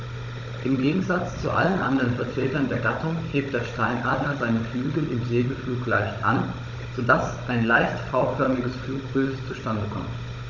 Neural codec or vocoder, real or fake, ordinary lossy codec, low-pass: codec, 16 kHz, 16 kbps, FunCodec, trained on Chinese and English, 50 frames a second; fake; none; 7.2 kHz